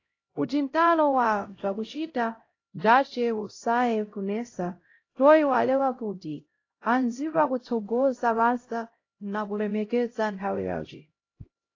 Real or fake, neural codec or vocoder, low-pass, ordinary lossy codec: fake; codec, 16 kHz, 0.5 kbps, X-Codec, HuBERT features, trained on LibriSpeech; 7.2 kHz; AAC, 32 kbps